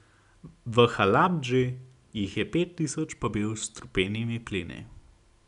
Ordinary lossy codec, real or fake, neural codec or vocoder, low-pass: none; real; none; 10.8 kHz